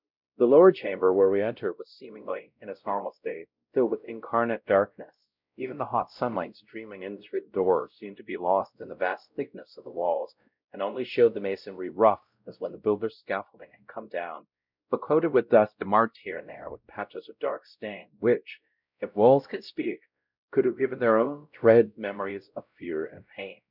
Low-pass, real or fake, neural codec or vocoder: 5.4 kHz; fake; codec, 16 kHz, 0.5 kbps, X-Codec, WavLM features, trained on Multilingual LibriSpeech